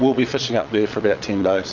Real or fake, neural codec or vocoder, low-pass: fake; vocoder, 22.05 kHz, 80 mel bands, Vocos; 7.2 kHz